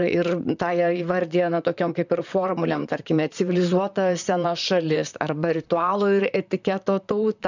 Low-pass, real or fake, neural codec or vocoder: 7.2 kHz; fake; vocoder, 44.1 kHz, 128 mel bands, Pupu-Vocoder